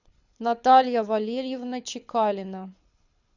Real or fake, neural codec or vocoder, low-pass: fake; codec, 24 kHz, 6 kbps, HILCodec; 7.2 kHz